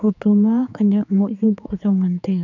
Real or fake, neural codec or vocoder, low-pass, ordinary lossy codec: fake; codec, 16 kHz, 4 kbps, X-Codec, HuBERT features, trained on general audio; 7.2 kHz; none